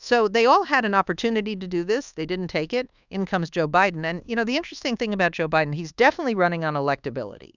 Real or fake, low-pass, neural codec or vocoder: fake; 7.2 kHz; codec, 24 kHz, 1.2 kbps, DualCodec